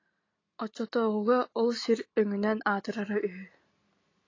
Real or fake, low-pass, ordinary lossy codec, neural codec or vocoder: real; 7.2 kHz; AAC, 32 kbps; none